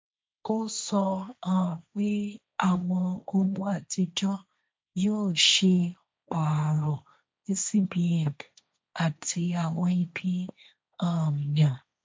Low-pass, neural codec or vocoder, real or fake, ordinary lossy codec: none; codec, 16 kHz, 1.1 kbps, Voila-Tokenizer; fake; none